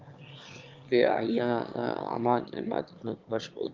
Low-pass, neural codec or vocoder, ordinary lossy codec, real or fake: 7.2 kHz; autoencoder, 22.05 kHz, a latent of 192 numbers a frame, VITS, trained on one speaker; Opus, 32 kbps; fake